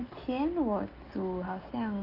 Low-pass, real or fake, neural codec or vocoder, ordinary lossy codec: 5.4 kHz; real; none; Opus, 32 kbps